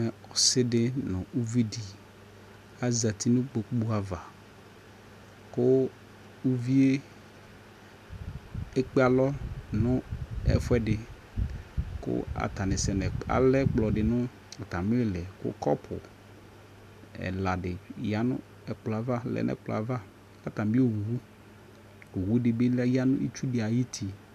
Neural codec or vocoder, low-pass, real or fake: none; 14.4 kHz; real